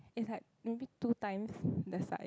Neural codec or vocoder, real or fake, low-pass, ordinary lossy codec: none; real; none; none